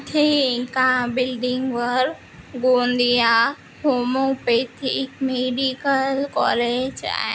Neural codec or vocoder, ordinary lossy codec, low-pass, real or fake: none; none; none; real